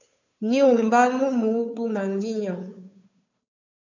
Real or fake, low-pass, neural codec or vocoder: fake; 7.2 kHz; codec, 16 kHz, 2 kbps, FunCodec, trained on Chinese and English, 25 frames a second